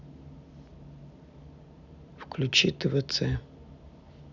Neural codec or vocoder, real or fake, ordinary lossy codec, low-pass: none; real; none; 7.2 kHz